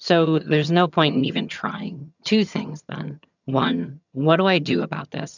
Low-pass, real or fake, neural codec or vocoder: 7.2 kHz; fake; vocoder, 22.05 kHz, 80 mel bands, HiFi-GAN